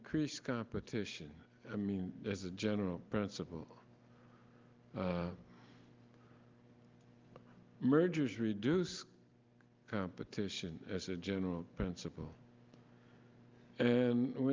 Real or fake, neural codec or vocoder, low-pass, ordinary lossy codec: real; none; 7.2 kHz; Opus, 32 kbps